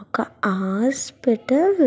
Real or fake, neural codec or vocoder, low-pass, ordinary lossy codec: real; none; none; none